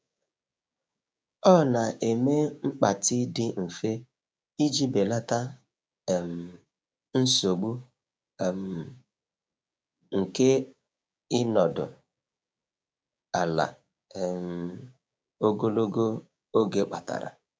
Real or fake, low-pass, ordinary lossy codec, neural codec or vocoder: fake; none; none; codec, 16 kHz, 6 kbps, DAC